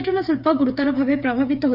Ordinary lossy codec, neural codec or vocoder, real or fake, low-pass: none; codec, 16 kHz, 8 kbps, FreqCodec, smaller model; fake; 5.4 kHz